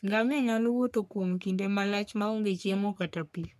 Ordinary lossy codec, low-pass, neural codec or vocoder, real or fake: none; 14.4 kHz; codec, 44.1 kHz, 3.4 kbps, Pupu-Codec; fake